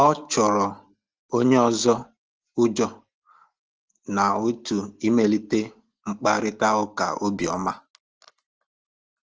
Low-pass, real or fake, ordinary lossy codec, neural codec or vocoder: 7.2 kHz; real; Opus, 16 kbps; none